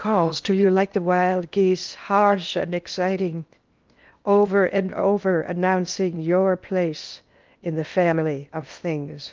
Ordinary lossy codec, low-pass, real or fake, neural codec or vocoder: Opus, 32 kbps; 7.2 kHz; fake; codec, 16 kHz in and 24 kHz out, 0.6 kbps, FocalCodec, streaming, 2048 codes